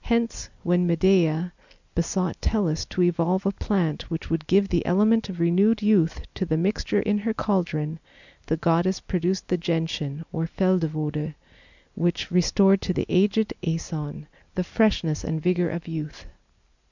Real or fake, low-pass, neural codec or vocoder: real; 7.2 kHz; none